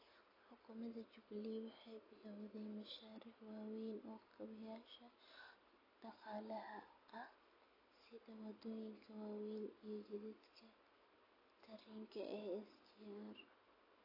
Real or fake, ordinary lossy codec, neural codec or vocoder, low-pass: real; AAC, 24 kbps; none; 5.4 kHz